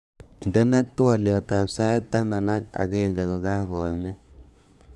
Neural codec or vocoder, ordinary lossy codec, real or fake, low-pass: codec, 24 kHz, 1 kbps, SNAC; none; fake; none